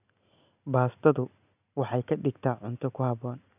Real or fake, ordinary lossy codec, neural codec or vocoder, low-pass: real; none; none; 3.6 kHz